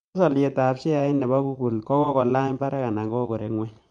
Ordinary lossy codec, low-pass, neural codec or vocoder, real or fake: MP3, 64 kbps; 9.9 kHz; vocoder, 22.05 kHz, 80 mel bands, Vocos; fake